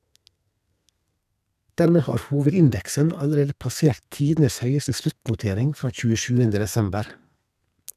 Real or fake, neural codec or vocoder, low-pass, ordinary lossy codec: fake; codec, 32 kHz, 1.9 kbps, SNAC; 14.4 kHz; none